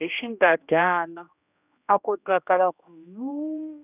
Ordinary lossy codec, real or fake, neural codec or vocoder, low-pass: none; fake; codec, 16 kHz, 1 kbps, X-Codec, HuBERT features, trained on general audio; 3.6 kHz